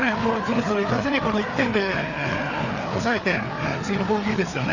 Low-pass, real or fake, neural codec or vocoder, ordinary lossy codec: 7.2 kHz; fake; codec, 16 kHz, 4 kbps, FreqCodec, larger model; none